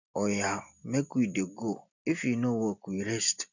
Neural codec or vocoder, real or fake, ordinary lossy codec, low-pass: none; real; none; 7.2 kHz